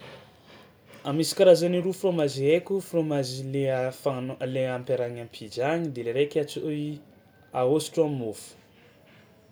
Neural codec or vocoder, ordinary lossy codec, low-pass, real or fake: none; none; none; real